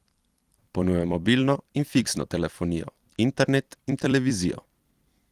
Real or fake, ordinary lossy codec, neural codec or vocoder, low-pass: fake; Opus, 24 kbps; vocoder, 44.1 kHz, 128 mel bands every 256 samples, BigVGAN v2; 14.4 kHz